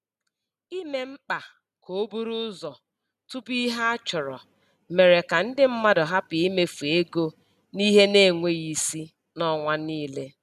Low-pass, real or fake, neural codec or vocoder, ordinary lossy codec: 14.4 kHz; real; none; none